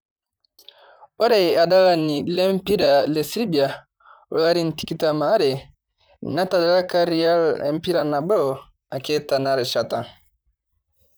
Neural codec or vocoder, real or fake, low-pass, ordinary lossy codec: vocoder, 44.1 kHz, 128 mel bands, Pupu-Vocoder; fake; none; none